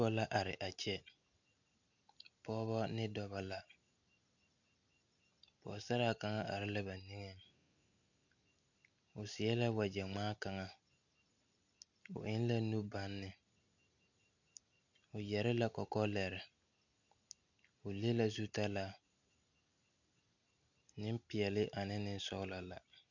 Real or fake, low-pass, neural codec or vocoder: real; 7.2 kHz; none